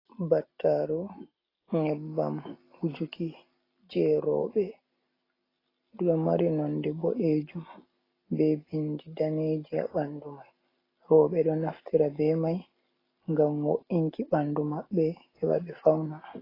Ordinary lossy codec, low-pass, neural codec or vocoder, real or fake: AAC, 24 kbps; 5.4 kHz; none; real